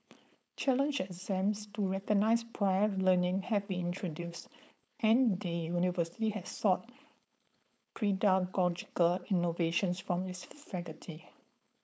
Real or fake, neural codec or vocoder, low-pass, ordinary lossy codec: fake; codec, 16 kHz, 4.8 kbps, FACodec; none; none